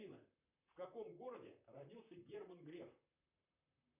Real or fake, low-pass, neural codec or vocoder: fake; 3.6 kHz; vocoder, 44.1 kHz, 128 mel bands, Pupu-Vocoder